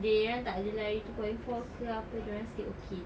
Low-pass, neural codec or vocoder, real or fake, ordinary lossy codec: none; none; real; none